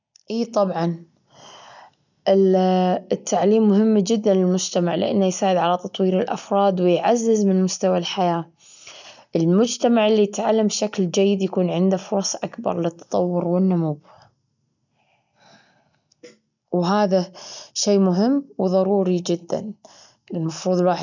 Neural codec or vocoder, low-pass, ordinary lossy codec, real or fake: none; 7.2 kHz; none; real